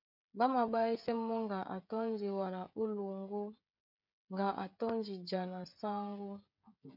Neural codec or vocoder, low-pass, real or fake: codec, 16 kHz, 16 kbps, FreqCodec, smaller model; 5.4 kHz; fake